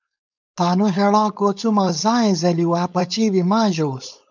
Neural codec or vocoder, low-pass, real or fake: codec, 16 kHz, 4.8 kbps, FACodec; 7.2 kHz; fake